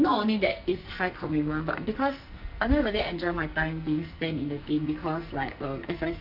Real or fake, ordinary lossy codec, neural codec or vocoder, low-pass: fake; none; codec, 44.1 kHz, 2.6 kbps, SNAC; 5.4 kHz